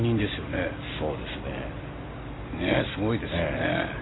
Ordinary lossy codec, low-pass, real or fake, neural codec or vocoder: AAC, 16 kbps; 7.2 kHz; real; none